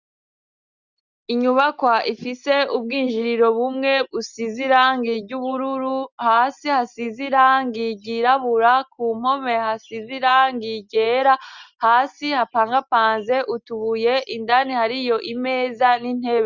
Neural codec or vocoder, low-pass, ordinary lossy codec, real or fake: none; 7.2 kHz; Opus, 64 kbps; real